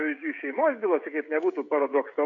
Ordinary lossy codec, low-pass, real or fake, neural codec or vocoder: AAC, 48 kbps; 7.2 kHz; fake; codec, 16 kHz, 16 kbps, FreqCodec, smaller model